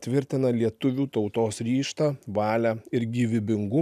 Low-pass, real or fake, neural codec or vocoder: 14.4 kHz; real; none